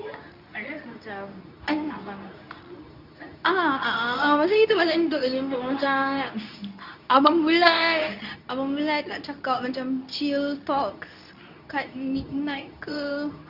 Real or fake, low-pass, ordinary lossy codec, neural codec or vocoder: fake; 5.4 kHz; none; codec, 24 kHz, 0.9 kbps, WavTokenizer, medium speech release version 2